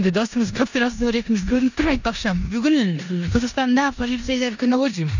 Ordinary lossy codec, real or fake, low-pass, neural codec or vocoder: none; fake; 7.2 kHz; codec, 16 kHz in and 24 kHz out, 0.9 kbps, LongCat-Audio-Codec, four codebook decoder